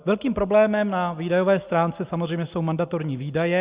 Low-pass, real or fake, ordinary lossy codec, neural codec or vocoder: 3.6 kHz; real; Opus, 64 kbps; none